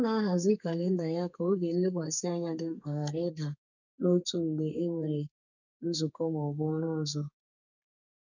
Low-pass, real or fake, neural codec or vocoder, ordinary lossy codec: 7.2 kHz; fake; codec, 32 kHz, 1.9 kbps, SNAC; none